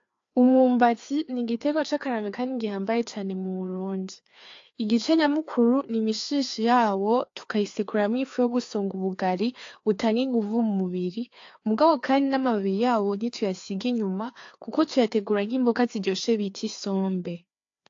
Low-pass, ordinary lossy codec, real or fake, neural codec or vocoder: 7.2 kHz; AAC, 48 kbps; fake; codec, 16 kHz, 2 kbps, FreqCodec, larger model